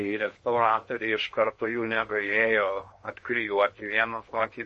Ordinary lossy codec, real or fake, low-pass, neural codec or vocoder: MP3, 32 kbps; fake; 10.8 kHz; codec, 16 kHz in and 24 kHz out, 0.8 kbps, FocalCodec, streaming, 65536 codes